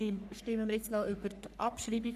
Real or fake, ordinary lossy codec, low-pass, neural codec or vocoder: fake; none; 14.4 kHz; codec, 44.1 kHz, 3.4 kbps, Pupu-Codec